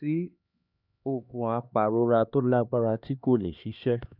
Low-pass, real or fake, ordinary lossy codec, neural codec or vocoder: 5.4 kHz; fake; none; codec, 16 kHz, 2 kbps, X-Codec, HuBERT features, trained on LibriSpeech